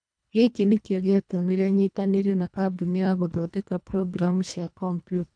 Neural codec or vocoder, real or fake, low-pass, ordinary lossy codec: codec, 24 kHz, 1.5 kbps, HILCodec; fake; 9.9 kHz; none